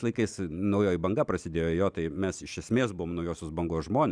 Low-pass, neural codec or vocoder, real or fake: 9.9 kHz; none; real